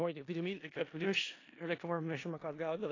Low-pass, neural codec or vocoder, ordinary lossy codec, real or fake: 7.2 kHz; codec, 16 kHz in and 24 kHz out, 0.4 kbps, LongCat-Audio-Codec, four codebook decoder; AAC, 32 kbps; fake